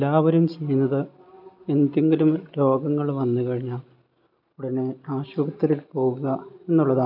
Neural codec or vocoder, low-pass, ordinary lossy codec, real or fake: none; 5.4 kHz; none; real